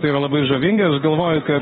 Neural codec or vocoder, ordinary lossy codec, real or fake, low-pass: autoencoder, 48 kHz, 32 numbers a frame, DAC-VAE, trained on Japanese speech; AAC, 16 kbps; fake; 19.8 kHz